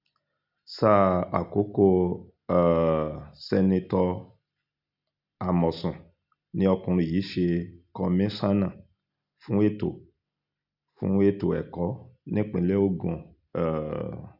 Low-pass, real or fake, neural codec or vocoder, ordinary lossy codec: 5.4 kHz; real; none; none